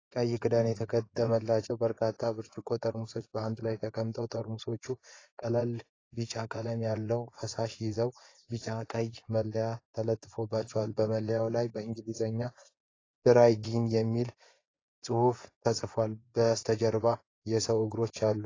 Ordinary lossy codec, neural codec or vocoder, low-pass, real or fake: AAC, 32 kbps; vocoder, 24 kHz, 100 mel bands, Vocos; 7.2 kHz; fake